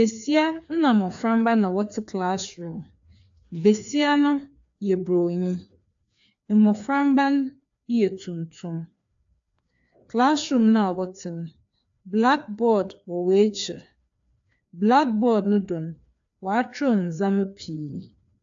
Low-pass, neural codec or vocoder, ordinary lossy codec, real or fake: 7.2 kHz; codec, 16 kHz, 2 kbps, FreqCodec, larger model; AAC, 64 kbps; fake